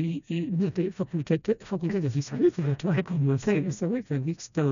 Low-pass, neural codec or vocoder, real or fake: 7.2 kHz; codec, 16 kHz, 1 kbps, FreqCodec, smaller model; fake